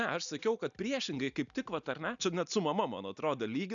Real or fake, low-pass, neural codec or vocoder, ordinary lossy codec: real; 7.2 kHz; none; MP3, 96 kbps